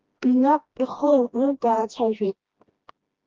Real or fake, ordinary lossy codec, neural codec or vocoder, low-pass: fake; Opus, 24 kbps; codec, 16 kHz, 1 kbps, FreqCodec, smaller model; 7.2 kHz